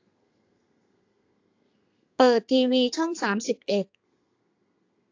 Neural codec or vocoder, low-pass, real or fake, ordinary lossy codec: codec, 32 kHz, 1.9 kbps, SNAC; 7.2 kHz; fake; AAC, 48 kbps